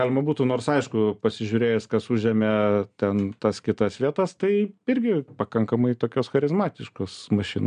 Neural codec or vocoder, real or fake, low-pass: none; real; 9.9 kHz